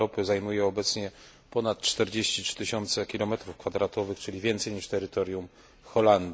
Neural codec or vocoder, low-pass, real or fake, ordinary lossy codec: none; none; real; none